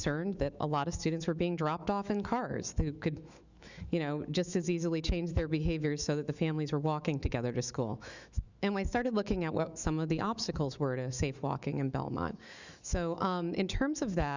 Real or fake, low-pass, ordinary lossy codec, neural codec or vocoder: fake; 7.2 kHz; Opus, 64 kbps; autoencoder, 48 kHz, 128 numbers a frame, DAC-VAE, trained on Japanese speech